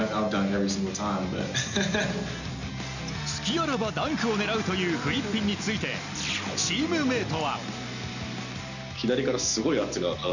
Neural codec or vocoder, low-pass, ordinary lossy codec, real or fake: none; 7.2 kHz; none; real